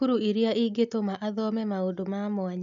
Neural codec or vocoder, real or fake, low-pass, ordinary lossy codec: none; real; 7.2 kHz; none